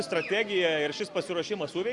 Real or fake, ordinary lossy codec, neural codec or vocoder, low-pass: real; Opus, 64 kbps; none; 10.8 kHz